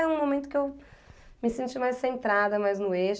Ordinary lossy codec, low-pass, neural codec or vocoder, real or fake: none; none; none; real